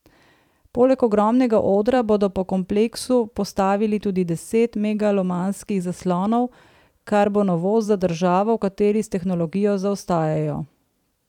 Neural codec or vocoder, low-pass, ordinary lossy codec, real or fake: vocoder, 44.1 kHz, 128 mel bands every 512 samples, BigVGAN v2; 19.8 kHz; none; fake